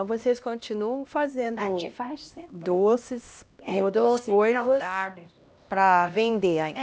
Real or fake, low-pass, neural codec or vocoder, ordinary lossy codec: fake; none; codec, 16 kHz, 1 kbps, X-Codec, HuBERT features, trained on LibriSpeech; none